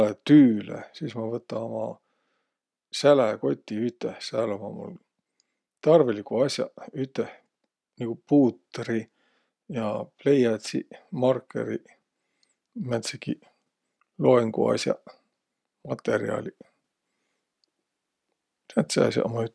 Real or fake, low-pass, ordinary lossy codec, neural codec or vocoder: real; none; none; none